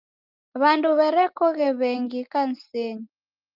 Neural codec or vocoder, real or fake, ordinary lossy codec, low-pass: none; real; Opus, 32 kbps; 5.4 kHz